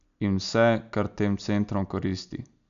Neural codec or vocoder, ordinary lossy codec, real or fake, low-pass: none; none; real; 7.2 kHz